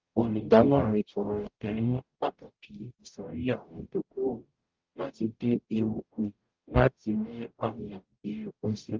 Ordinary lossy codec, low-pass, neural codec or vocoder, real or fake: Opus, 32 kbps; 7.2 kHz; codec, 44.1 kHz, 0.9 kbps, DAC; fake